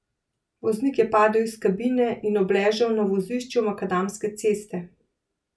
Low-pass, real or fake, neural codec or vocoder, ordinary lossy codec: none; real; none; none